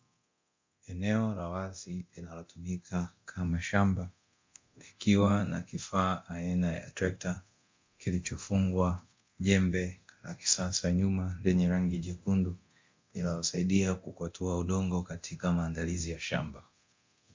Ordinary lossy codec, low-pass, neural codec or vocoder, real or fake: MP3, 48 kbps; 7.2 kHz; codec, 24 kHz, 0.9 kbps, DualCodec; fake